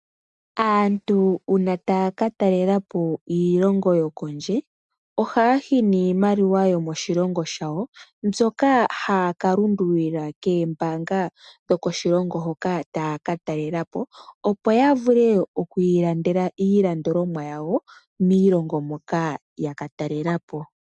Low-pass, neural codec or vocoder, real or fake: 10.8 kHz; none; real